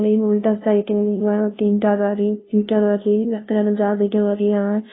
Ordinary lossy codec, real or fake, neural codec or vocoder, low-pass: AAC, 16 kbps; fake; codec, 16 kHz, 0.5 kbps, FunCodec, trained on LibriTTS, 25 frames a second; 7.2 kHz